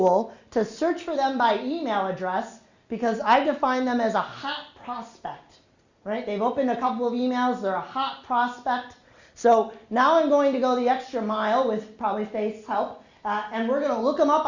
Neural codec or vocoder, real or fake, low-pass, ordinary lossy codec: none; real; 7.2 kHz; Opus, 64 kbps